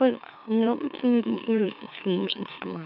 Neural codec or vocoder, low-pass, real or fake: autoencoder, 44.1 kHz, a latent of 192 numbers a frame, MeloTTS; 5.4 kHz; fake